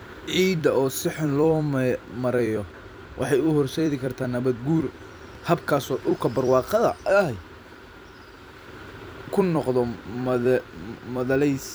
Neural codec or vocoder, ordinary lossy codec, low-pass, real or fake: vocoder, 44.1 kHz, 128 mel bands every 256 samples, BigVGAN v2; none; none; fake